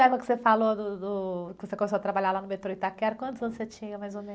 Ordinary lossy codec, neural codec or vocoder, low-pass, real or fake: none; none; none; real